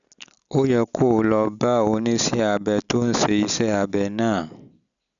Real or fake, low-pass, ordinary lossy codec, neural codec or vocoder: real; 7.2 kHz; none; none